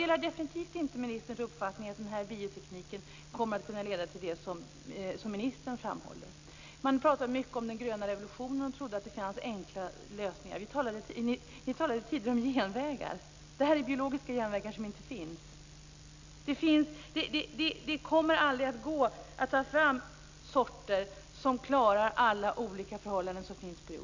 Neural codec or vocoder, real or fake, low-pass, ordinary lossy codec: none; real; 7.2 kHz; Opus, 64 kbps